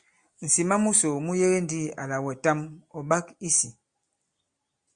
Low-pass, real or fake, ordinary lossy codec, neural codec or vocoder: 9.9 kHz; real; Opus, 64 kbps; none